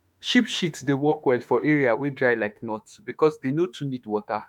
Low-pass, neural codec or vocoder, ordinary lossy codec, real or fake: 19.8 kHz; autoencoder, 48 kHz, 32 numbers a frame, DAC-VAE, trained on Japanese speech; none; fake